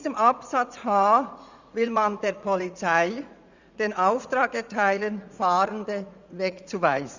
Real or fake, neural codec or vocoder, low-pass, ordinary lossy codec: fake; vocoder, 44.1 kHz, 80 mel bands, Vocos; 7.2 kHz; Opus, 64 kbps